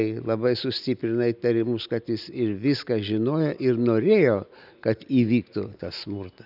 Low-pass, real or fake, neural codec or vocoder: 5.4 kHz; real; none